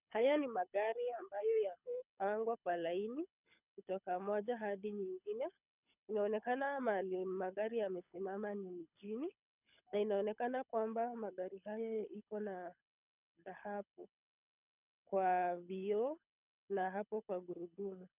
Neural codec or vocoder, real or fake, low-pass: codec, 24 kHz, 6 kbps, HILCodec; fake; 3.6 kHz